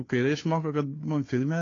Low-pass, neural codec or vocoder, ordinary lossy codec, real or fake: 7.2 kHz; codec, 16 kHz, 2 kbps, FunCodec, trained on Chinese and English, 25 frames a second; AAC, 32 kbps; fake